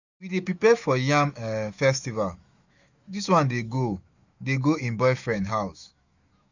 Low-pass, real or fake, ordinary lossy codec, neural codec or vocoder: 7.2 kHz; fake; none; autoencoder, 48 kHz, 128 numbers a frame, DAC-VAE, trained on Japanese speech